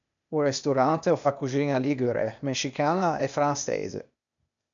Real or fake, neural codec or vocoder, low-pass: fake; codec, 16 kHz, 0.8 kbps, ZipCodec; 7.2 kHz